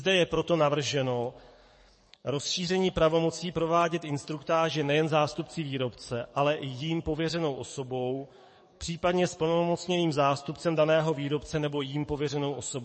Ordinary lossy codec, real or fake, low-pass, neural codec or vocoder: MP3, 32 kbps; fake; 10.8 kHz; codec, 44.1 kHz, 7.8 kbps, DAC